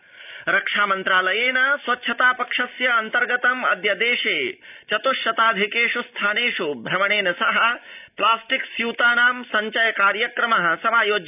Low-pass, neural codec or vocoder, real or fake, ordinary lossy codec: 3.6 kHz; none; real; none